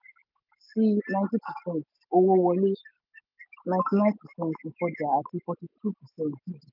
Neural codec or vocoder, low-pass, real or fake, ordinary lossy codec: none; 5.4 kHz; real; none